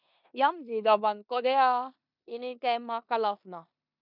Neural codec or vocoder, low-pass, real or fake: codec, 16 kHz in and 24 kHz out, 0.9 kbps, LongCat-Audio-Codec, four codebook decoder; 5.4 kHz; fake